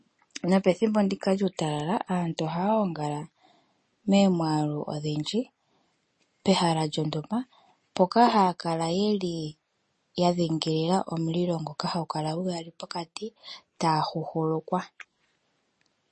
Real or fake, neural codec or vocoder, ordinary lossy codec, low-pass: real; none; MP3, 32 kbps; 10.8 kHz